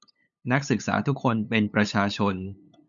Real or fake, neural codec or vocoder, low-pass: fake; codec, 16 kHz, 8 kbps, FunCodec, trained on LibriTTS, 25 frames a second; 7.2 kHz